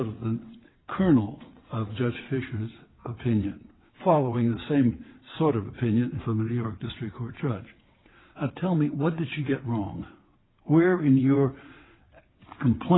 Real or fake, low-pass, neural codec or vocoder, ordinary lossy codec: fake; 7.2 kHz; vocoder, 22.05 kHz, 80 mel bands, WaveNeXt; AAC, 16 kbps